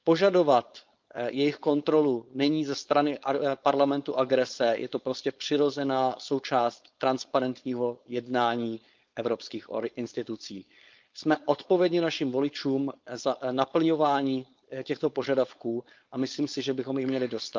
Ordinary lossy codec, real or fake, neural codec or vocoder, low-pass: Opus, 24 kbps; fake; codec, 16 kHz, 4.8 kbps, FACodec; 7.2 kHz